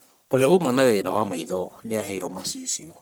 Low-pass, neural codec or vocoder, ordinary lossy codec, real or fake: none; codec, 44.1 kHz, 1.7 kbps, Pupu-Codec; none; fake